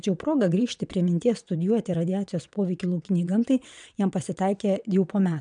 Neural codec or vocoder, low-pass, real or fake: vocoder, 22.05 kHz, 80 mel bands, WaveNeXt; 9.9 kHz; fake